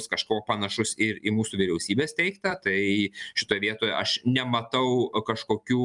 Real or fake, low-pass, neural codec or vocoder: real; 10.8 kHz; none